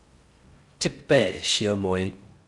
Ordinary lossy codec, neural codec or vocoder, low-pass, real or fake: Opus, 64 kbps; codec, 16 kHz in and 24 kHz out, 0.6 kbps, FocalCodec, streaming, 4096 codes; 10.8 kHz; fake